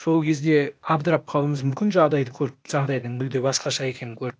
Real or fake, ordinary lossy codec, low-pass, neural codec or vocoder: fake; none; none; codec, 16 kHz, 0.8 kbps, ZipCodec